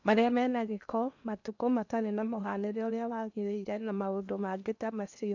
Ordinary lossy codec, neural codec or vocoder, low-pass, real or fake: none; codec, 16 kHz in and 24 kHz out, 0.8 kbps, FocalCodec, streaming, 65536 codes; 7.2 kHz; fake